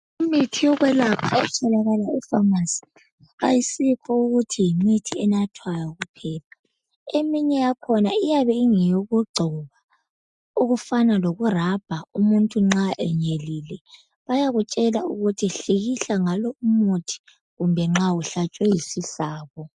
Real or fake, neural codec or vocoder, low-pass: real; none; 10.8 kHz